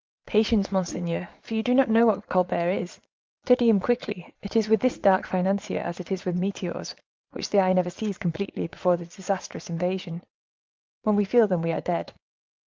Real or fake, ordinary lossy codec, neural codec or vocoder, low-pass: fake; Opus, 32 kbps; vocoder, 22.05 kHz, 80 mel bands, Vocos; 7.2 kHz